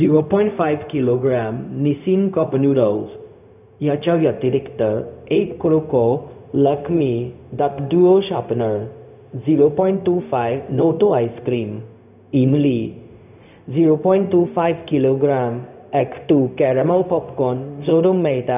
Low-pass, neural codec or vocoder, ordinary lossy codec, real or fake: 3.6 kHz; codec, 16 kHz, 0.4 kbps, LongCat-Audio-Codec; none; fake